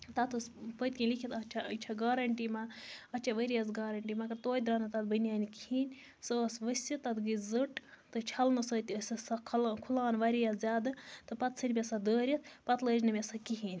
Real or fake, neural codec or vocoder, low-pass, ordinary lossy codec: real; none; none; none